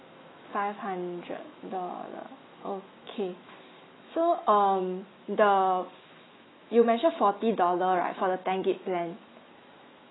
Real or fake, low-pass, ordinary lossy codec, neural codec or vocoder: fake; 7.2 kHz; AAC, 16 kbps; autoencoder, 48 kHz, 128 numbers a frame, DAC-VAE, trained on Japanese speech